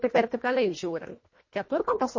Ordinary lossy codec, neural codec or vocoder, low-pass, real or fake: MP3, 32 kbps; codec, 24 kHz, 1.5 kbps, HILCodec; 7.2 kHz; fake